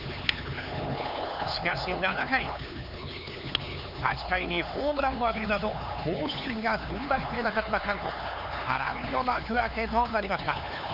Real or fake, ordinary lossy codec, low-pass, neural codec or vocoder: fake; none; 5.4 kHz; codec, 16 kHz, 4 kbps, X-Codec, HuBERT features, trained on LibriSpeech